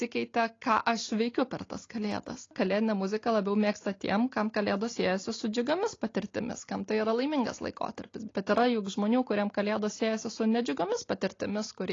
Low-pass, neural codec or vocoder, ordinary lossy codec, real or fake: 7.2 kHz; none; AAC, 32 kbps; real